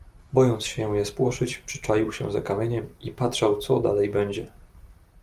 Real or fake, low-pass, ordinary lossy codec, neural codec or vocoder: real; 14.4 kHz; Opus, 32 kbps; none